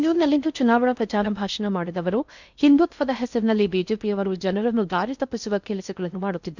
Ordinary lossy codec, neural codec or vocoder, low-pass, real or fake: none; codec, 16 kHz in and 24 kHz out, 0.6 kbps, FocalCodec, streaming, 4096 codes; 7.2 kHz; fake